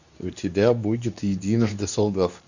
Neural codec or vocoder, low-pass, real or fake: codec, 24 kHz, 0.9 kbps, WavTokenizer, medium speech release version 2; 7.2 kHz; fake